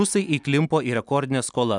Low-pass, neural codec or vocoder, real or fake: 10.8 kHz; none; real